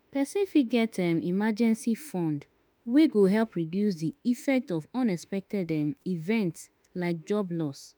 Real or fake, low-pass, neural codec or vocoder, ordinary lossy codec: fake; none; autoencoder, 48 kHz, 32 numbers a frame, DAC-VAE, trained on Japanese speech; none